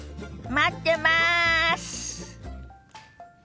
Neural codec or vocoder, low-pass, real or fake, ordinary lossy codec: none; none; real; none